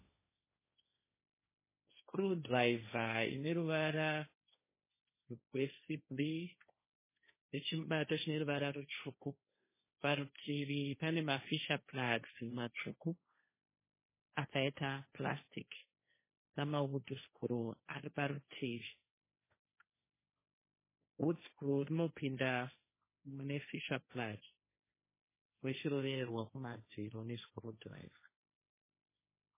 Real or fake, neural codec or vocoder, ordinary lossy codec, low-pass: fake; codec, 16 kHz, 1.1 kbps, Voila-Tokenizer; MP3, 16 kbps; 3.6 kHz